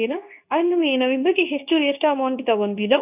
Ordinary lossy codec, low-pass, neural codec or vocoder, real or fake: none; 3.6 kHz; codec, 24 kHz, 0.9 kbps, WavTokenizer, medium speech release version 2; fake